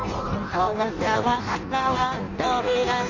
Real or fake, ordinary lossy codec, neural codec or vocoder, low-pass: fake; none; codec, 16 kHz in and 24 kHz out, 0.6 kbps, FireRedTTS-2 codec; 7.2 kHz